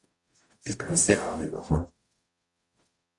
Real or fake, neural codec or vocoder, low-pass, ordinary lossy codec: fake; codec, 44.1 kHz, 0.9 kbps, DAC; 10.8 kHz; Opus, 64 kbps